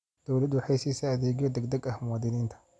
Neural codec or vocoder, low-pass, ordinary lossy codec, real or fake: none; none; none; real